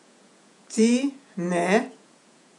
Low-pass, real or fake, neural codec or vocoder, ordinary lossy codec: 10.8 kHz; fake; vocoder, 48 kHz, 128 mel bands, Vocos; none